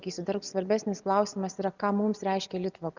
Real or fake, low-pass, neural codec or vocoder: real; 7.2 kHz; none